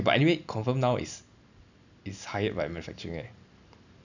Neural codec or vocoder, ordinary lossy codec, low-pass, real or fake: none; none; 7.2 kHz; real